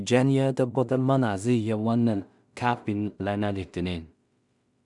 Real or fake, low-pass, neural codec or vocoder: fake; 10.8 kHz; codec, 16 kHz in and 24 kHz out, 0.4 kbps, LongCat-Audio-Codec, two codebook decoder